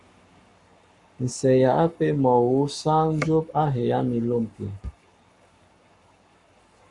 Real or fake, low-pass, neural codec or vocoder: fake; 10.8 kHz; codec, 44.1 kHz, 7.8 kbps, Pupu-Codec